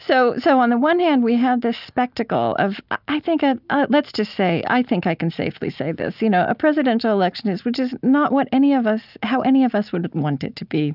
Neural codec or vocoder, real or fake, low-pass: none; real; 5.4 kHz